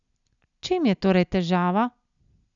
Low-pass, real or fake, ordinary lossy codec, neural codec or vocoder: 7.2 kHz; real; none; none